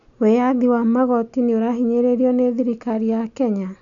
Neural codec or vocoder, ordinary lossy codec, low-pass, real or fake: none; none; 7.2 kHz; real